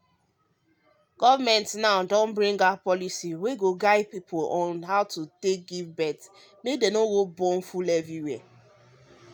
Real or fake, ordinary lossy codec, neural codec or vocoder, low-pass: real; none; none; none